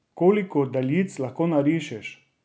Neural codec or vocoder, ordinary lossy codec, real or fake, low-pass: none; none; real; none